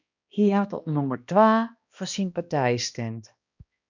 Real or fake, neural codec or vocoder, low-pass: fake; codec, 16 kHz, 1 kbps, X-Codec, HuBERT features, trained on balanced general audio; 7.2 kHz